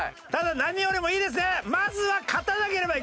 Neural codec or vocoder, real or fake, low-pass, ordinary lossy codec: none; real; none; none